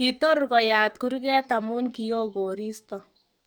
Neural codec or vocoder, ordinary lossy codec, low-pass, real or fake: codec, 44.1 kHz, 2.6 kbps, SNAC; none; none; fake